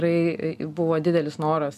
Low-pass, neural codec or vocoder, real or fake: 14.4 kHz; none; real